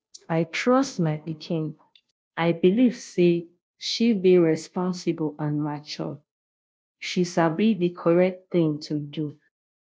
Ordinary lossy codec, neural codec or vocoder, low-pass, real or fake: none; codec, 16 kHz, 0.5 kbps, FunCodec, trained on Chinese and English, 25 frames a second; none; fake